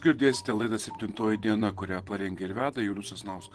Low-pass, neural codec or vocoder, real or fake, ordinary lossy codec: 10.8 kHz; vocoder, 24 kHz, 100 mel bands, Vocos; fake; Opus, 16 kbps